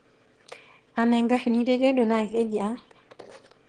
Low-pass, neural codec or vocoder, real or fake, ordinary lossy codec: 9.9 kHz; autoencoder, 22.05 kHz, a latent of 192 numbers a frame, VITS, trained on one speaker; fake; Opus, 16 kbps